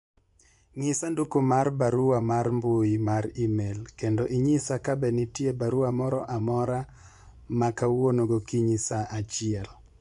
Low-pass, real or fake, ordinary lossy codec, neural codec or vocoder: 10.8 kHz; fake; none; vocoder, 24 kHz, 100 mel bands, Vocos